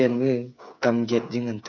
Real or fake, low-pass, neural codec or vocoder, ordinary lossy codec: fake; 7.2 kHz; codec, 16 kHz, 8 kbps, FreqCodec, smaller model; none